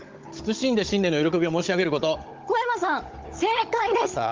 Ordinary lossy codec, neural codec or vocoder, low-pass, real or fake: Opus, 32 kbps; codec, 16 kHz, 16 kbps, FunCodec, trained on Chinese and English, 50 frames a second; 7.2 kHz; fake